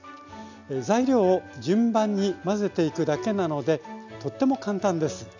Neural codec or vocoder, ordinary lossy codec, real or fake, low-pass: none; none; real; 7.2 kHz